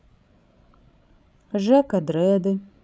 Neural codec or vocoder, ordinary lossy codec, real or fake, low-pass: codec, 16 kHz, 16 kbps, FreqCodec, larger model; none; fake; none